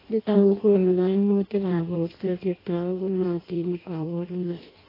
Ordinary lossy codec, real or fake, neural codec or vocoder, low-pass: AAC, 24 kbps; fake; codec, 16 kHz in and 24 kHz out, 0.6 kbps, FireRedTTS-2 codec; 5.4 kHz